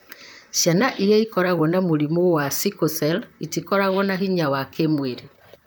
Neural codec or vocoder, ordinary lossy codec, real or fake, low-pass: vocoder, 44.1 kHz, 128 mel bands, Pupu-Vocoder; none; fake; none